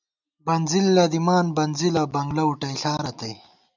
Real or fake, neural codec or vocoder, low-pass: real; none; 7.2 kHz